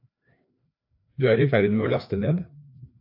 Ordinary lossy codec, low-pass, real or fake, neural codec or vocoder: AAC, 48 kbps; 5.4 kHz; fake; codec, 16 kHz, 2 kbps, FreqCodec, larger model